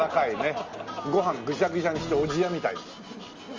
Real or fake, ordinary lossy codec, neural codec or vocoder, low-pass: real; Opus, 32 kbps; none; 7.2 kHz